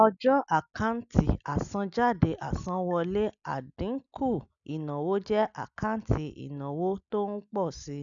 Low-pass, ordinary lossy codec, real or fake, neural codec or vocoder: 7.2 kHz; none; real; none